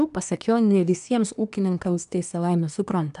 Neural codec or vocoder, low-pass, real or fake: codec, 24 kHz, 1 kbps, SNAC; 10.8 kHz; fake